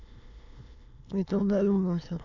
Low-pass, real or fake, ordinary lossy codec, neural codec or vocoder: 7.2 kHz; fake; none; autoencoder, 22.05 kHz, a latent of 192 numbers a frame, VITS, trained on many speakers